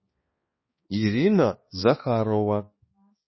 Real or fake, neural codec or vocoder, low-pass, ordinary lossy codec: fake; codec, 16 kHz, 2 kbps, X-Codec, HuBERT features, trained on balanced general audio; 7.2 kHz; MP3, 24 kbps